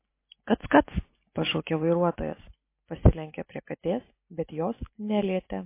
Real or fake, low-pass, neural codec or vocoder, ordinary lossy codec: real; 3.6 kHz; none; MP3, 24 kbps